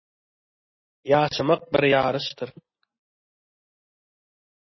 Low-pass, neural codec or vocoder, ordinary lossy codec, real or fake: 7.2 kHz; none; MP3, 24 kbps; real